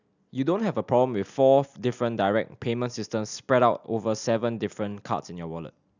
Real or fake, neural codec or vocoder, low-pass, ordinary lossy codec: real; none; 7.2 kHz; none